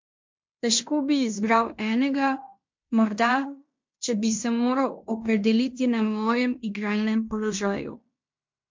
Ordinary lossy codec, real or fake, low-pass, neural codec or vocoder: MP3, 48 kbps; fake; 7.2 kHz; codec, 16 kHz in and 24 kHz out, 0.9 kbps, LongCat-Audio-Codec, fine tuned four codebook decoder